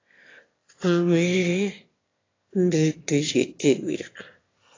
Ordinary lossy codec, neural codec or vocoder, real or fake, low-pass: AAC, 32 kbps; autoencoder, 22.05 kHz, a latent of 192 numbers a frame, VITS, trained on one speaker; fake; 7.2 kHz